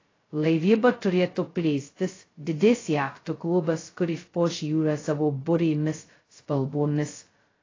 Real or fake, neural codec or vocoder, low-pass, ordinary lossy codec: fake; codec, 16 kHz, 0.2 kbps, FocalCodec; 7.2 kHz; AAC, 32 kbps